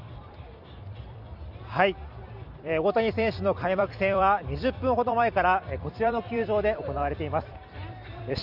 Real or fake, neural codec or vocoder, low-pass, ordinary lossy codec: fake; vocoder, 44.1 kHz, 128 mel bands every 256 samples, BigVGAN v2; 5.4 kHz; none